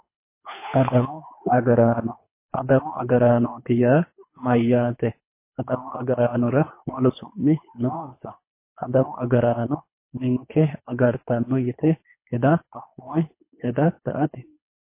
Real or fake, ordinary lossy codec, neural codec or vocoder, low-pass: fake; MP3, 24 kbps; codec, 24 kHz, 3 kbps, HILCodec; 3.6 kHz